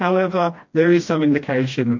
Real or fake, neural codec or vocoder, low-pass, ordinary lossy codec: fake; codec, 16 kHz, 1 kbps, FreqCodec, smaller model; 7.2 kHz; MP3, 48 kbps